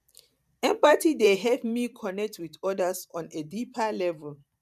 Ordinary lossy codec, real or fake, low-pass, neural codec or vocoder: none; fake; 14.4 kHz; vocoder, 44.1 kHz, 128 mel bands every 512 samples, BigVGAN v2